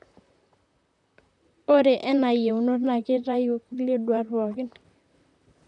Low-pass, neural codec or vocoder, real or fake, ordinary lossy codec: 10.8 kHz; vocoder, 44.1 kHz, 128 mel bands, Pupu-Vocoder; fake; none